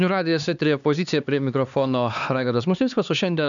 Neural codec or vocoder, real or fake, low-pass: codec, 16 kHz, 2 kbps, X-Codec, HuBERT features, trained on LibriSpeech; fake; 7.2 kHz